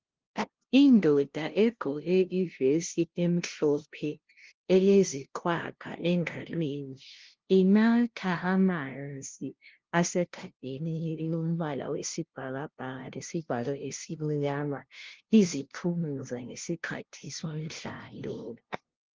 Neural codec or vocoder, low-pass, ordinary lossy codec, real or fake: codec, 16 kHz, 0.5 kbps, FunCodec, trained on LibriTTS, 25 frames a second; 7.2 kHz; Opus, 16 kbps; fake